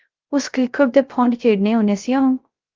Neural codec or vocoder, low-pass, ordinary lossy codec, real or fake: codec, 16 kHz, 0.3 kbps, FocalCodec; 7.2 kHz; Opus, 24 kbps; fake